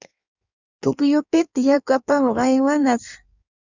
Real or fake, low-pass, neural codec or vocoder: fake; 7.2 kHz; codec, 16 kHz in and 24 kHz out, 1.1 kbps, FireRedTTS-2 codec